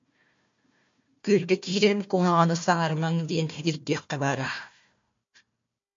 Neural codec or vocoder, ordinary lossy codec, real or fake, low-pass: codec, 16 kHz, 1 kbps, FunCodec, trained on Chinese and English, 50 frames a second; MP3, 48 kbps; fake; 7.2 kHz